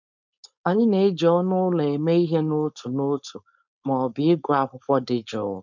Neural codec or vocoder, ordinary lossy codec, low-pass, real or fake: codec, 16 kHz, 4.8 kbps, FACodec; none; 7.2 kHz; fake